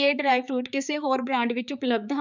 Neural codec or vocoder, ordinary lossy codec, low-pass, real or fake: codec, 16 kHz, 4 kbps, X-Codec, HuBERT features, trained on balanced general audio; none; 7.2 kHz; fake